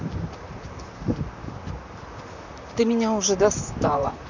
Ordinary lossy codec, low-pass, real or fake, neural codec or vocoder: none; 7.2 kHz; fake; vocoder, 44.1 kHz, 128 mel bands, Pupu-Vocoder